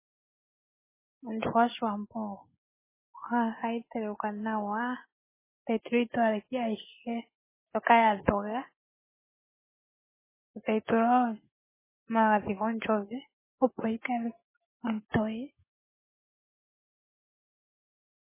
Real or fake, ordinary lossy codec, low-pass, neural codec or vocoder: real; MP3, 16 kbps; 3.6 kHz; none